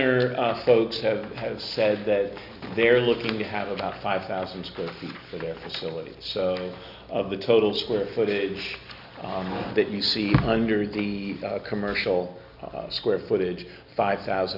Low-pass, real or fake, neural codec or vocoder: 5.4 kHz; real; none